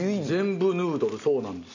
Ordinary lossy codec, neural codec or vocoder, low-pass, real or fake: none; none; 7.2 kHz; real